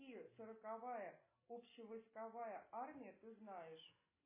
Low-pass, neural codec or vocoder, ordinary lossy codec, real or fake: 3.6 kHz; none; MP3, 16 kbps; real